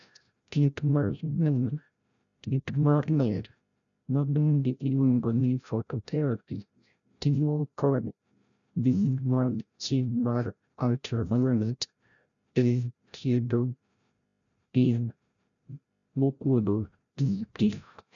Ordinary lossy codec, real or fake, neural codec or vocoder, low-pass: none; fake; codec, 16 kHz, 0.5 kbps, FreqCodec, larger model; 7.2 kHz